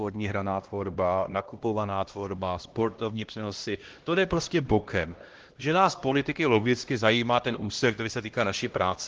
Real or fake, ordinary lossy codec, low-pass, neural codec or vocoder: fake; Opus, 16 kbps; 7.2 kHz; codec, 16 kHz, 1 kbps, X-Codec, HuBERT features, trained on LibriSpeech